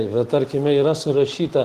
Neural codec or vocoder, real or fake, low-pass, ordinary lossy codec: none; real; 14.4 kHz; Opus, 16 kbps